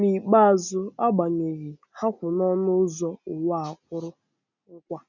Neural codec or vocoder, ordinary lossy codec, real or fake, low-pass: none; none; real; 7.2 kHz